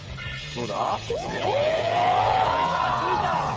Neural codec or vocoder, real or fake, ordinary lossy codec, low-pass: codec, 16 kHz, 8 kbps, FreqCodec, smaller model; fake; none; none